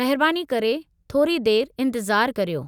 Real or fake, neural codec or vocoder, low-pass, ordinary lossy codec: real; none; 19.8 kHz; none